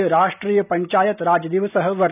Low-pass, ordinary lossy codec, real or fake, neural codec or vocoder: 3.6 kHz; none; real; none